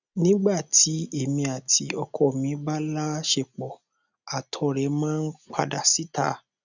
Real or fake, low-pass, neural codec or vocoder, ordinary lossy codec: real; 7.2 kHz; none; none